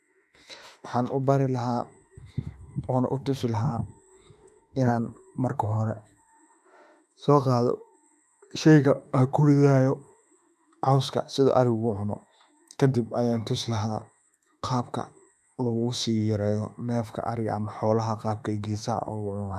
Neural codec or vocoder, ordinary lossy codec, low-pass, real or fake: autoencoder, 48 kHz, 32 numbers a frame, DAC-VAE, trained on Japanese speech; none; 14.4 kHz; fake